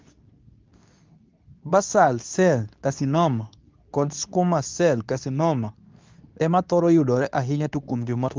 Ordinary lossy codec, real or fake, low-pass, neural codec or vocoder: Opus, 24 kbps; fake; 7.2 kHz; codec, 24 kHz, 0.9 kbps, WavTokenizer, medium speech release version 2